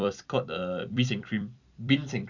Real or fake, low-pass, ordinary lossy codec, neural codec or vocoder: fake; 7.2 kHz; none; vocoder, 44.1 kHz, 128 mel bands every 256 samples, BigVGAN v2